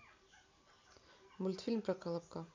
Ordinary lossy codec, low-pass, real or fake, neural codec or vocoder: MP3, 64 kbps; 7.2 kHz; real; none